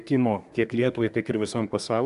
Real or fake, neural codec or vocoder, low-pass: fake; codec, 24 kHz, 1 kbps, SNAC; 10.8 kHz